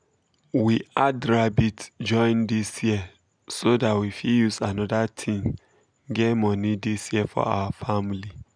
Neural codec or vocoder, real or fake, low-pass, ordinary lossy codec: none; real; 9.9 kHz; none